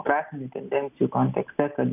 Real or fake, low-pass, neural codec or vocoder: real; 3.6 kHz; none